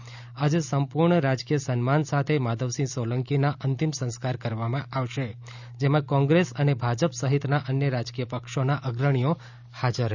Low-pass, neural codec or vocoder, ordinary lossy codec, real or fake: 7.2 kHz; none; none; real